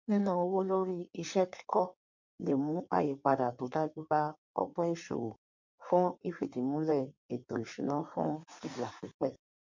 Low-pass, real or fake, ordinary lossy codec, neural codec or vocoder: 7.2 kHz; fake; MP3, 48 kbps; codec, 16 kHz in and 24 kHz out, 1.1 kbps, FireRedTTS-2 codec